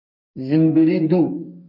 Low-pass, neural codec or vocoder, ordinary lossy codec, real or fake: 5.4 kHz; codec, 32 kHz, 1.9 kbps, SNAC; MP3, 32 kbps; fake